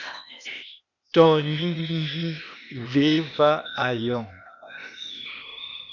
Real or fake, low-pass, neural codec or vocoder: fake; 7.2 kHz; codec, 16 kHz, 0.8 kbps, ZipCodec